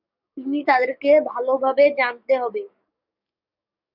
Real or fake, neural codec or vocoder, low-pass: fake; codec, 44.1 kHz, 7.8 kbps, DAC; 5.4 kHz